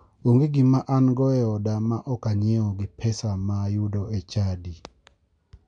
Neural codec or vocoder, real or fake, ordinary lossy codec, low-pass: none; real; none; 9.9 kHz